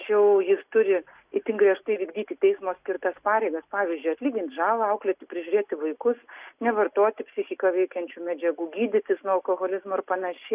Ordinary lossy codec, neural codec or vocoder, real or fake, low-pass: Opus, 64 kbps; none; real; 3.6 kHz